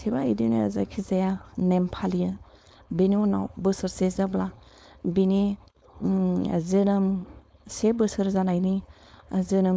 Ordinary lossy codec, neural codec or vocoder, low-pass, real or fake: none; codec, 16 kHz, 4.8 kbps, FACodec; none; fake